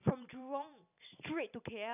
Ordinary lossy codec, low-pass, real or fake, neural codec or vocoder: none; 3.6 kHz; fake; vocoder, 44.1 kHz, 128 mel bands every 256 samples, BigVGAN v2